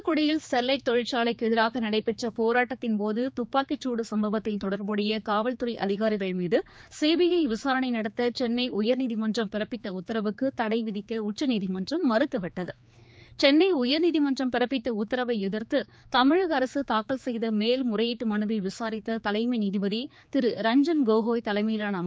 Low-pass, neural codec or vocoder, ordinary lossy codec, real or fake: none; codec, 16 kHz, 4 kbps, X-Codec, HuBERT features, trained on general audio; none; fake